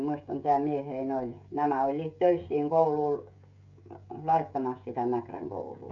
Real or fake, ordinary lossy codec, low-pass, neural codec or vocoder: fake; none; 7.2 kHz; codec, 16 kHz, 16 kbps, FreqCodec, smaller model